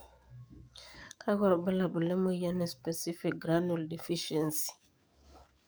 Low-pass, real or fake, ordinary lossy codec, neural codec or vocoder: none; fake; none; codec, 44.1 kHz, 7.8 kbps, DAC